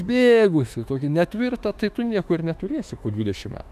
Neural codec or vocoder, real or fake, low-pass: autoencoder, 48 kHz, 32 numbers a frame, DAC-VAE, trained on Japanese speech; fake; 14.4 kHz